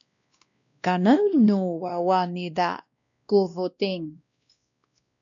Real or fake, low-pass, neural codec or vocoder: fake; 7.2 kHz; codec, 16 kHz, 1 kbps, X-Codec, WavLM features, trained on Multilingual LibriSpeech